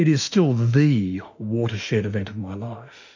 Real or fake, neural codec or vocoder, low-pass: fake; autoencoder, 48 kHz, 32 numbers a frame, DAC-VAE, trained on Japanese speech; 7.2 kHz